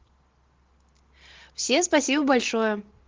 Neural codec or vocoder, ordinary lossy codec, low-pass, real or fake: none; Opus, 16 kbps; 7.2 kHz; real